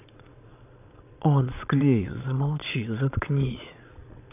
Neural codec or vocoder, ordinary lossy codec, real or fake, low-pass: vocoder, 22.05 kHz, 80 mel bands, Vocos; none; fake; 3.6 kHz